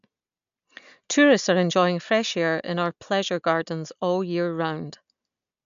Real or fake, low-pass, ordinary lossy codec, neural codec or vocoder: real; 7.2 kHz; AAC, 96 kbps; none